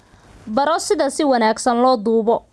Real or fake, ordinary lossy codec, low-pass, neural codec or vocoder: real; none; none; none